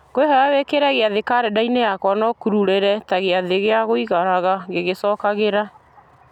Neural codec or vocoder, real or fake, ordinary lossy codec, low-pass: none; real; none; 19.8 kHz